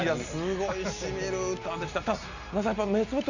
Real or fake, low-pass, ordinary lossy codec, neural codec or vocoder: real; 7.2 kHz; none; none